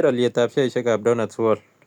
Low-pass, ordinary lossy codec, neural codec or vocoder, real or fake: 19.8 kHz; none; none; real